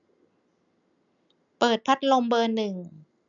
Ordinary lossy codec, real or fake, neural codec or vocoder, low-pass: none; real; none; 7.2 kHz